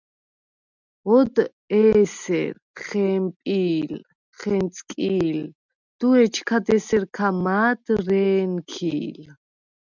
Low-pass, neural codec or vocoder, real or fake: 7.2 kHz; none; real